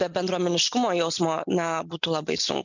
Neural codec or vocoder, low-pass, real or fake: none; 7.2 kHz; real